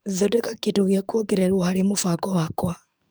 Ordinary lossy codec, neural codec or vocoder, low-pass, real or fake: none; codec, 44.1 kHz, 7.8 kbps, DAC; none; fake